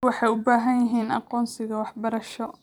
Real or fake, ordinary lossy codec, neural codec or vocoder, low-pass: fake; none; vocoder, 44.1 kHz, 128 mel bands every 256 samples, BigVGAN v2; 19.8 kHz